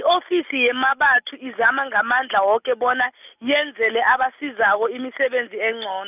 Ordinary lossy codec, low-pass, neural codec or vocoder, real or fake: none; 3.6 kHz; none; real